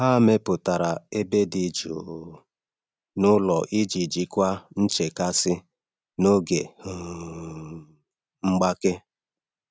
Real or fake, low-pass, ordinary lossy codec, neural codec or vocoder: real; none; none; none